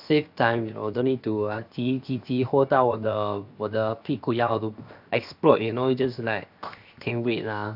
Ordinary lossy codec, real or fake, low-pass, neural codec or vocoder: none; fake; 5.4 kHz; codec, 16 kHz, 0.7 kbps, FocalCodec